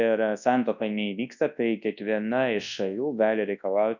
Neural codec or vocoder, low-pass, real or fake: codec, 24 kHz, 0.9 kbps, WavTokenizer, large speech release; 7.2 kHz; fake